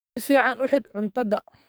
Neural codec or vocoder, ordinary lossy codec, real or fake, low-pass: codec, 44.1 kHz, 2.6 kbps, SNAC; none; fake; none